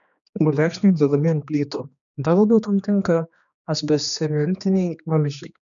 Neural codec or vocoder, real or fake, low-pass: codec, 16 kHz, 2 kbps, X-Codec, HuBERT features, trained on general audio; fake; 7.2 kHz